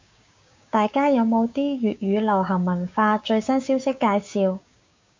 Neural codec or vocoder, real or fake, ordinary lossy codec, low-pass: codec, 16 kHz, 6 kbps, DAC; fake; MP3, 64 kbps; 7.2 kHz